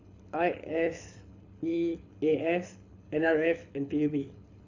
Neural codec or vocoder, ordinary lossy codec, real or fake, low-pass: codec, 24 kHz, 6 kbps, HILCodec; AAC, 48 kbps; fake; 7.2 kHz